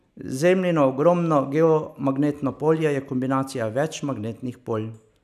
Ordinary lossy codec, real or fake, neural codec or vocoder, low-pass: none; real; none; 14.4 kHz